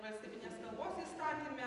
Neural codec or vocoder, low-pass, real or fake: vocoder, 24 kHz, 100 mel bands, Vocos; 10.8 kHz; fake